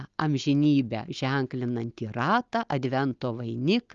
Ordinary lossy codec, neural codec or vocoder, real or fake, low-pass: Opus, 32 kbps; none; real; 7.2 kHz